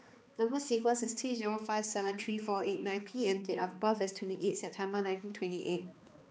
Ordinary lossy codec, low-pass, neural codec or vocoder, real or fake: none; none; codec, 16 kHz, 2 kbps, X-Codec, HuBERT features, trained on balanced general audio; fake